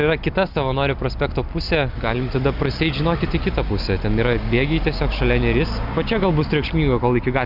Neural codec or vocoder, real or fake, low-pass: none; real; 5.4 kHz